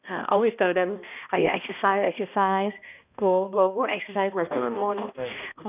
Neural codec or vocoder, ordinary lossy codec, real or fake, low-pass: codec, 16 kHz, 1 kbps, X-Codec, HuBERT features, trained on balanced general audio; none; fake; 3.6 kHz